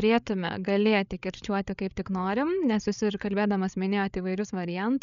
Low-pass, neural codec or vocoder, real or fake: 7.2 kHz; codec, 16 kHz, 8 kbps, FreqCodec, larger model; fake